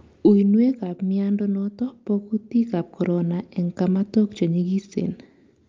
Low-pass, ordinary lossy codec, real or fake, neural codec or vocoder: 7.2 kHz; Opus, 24 kbps; real; none